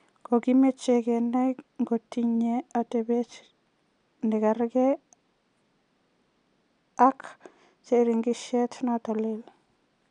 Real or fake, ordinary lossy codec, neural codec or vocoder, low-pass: real; none; none; 9.9 kHz